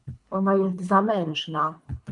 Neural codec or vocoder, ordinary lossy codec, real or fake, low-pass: codec, 24 kHz, 3 kbps, HILCodec; MP3, 64 kbps; fake; 10.8 kHz